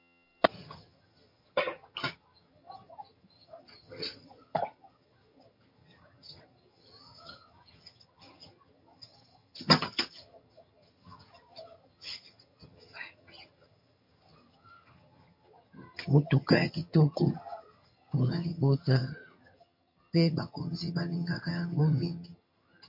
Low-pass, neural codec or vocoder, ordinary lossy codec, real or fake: 5.4 kHz; vocoder, 22.05 kHz, 80 mel bands, HiFi-GAN; MP3, 32 kbps; fake